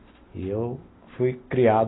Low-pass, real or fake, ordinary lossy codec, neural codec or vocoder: 7.2 kHz; real; AAC, 16 kbps; none